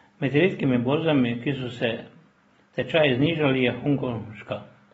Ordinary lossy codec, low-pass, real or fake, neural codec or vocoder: AAC, 24 kbps; 10.8 kHz; real; none